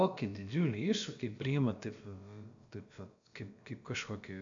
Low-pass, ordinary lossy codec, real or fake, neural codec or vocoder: 7.2 kHz; AAC, 64 kbps; fake; codec, 16 kHz, about 1 kbps, DyCAST, with the encoder's durations